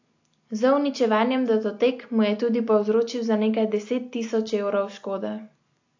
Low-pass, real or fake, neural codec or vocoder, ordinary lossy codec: 7.2 kHz; real; none; AAC, 48 kbps